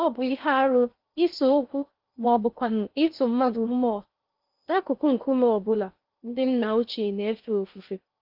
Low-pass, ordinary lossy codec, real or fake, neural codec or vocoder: 5.4 kHz; Opus, 32 kbps; fake; codec, 16 kHz in and 24 kHz out, 0.6 kbps, FocalCodec, streaming, 2048 codes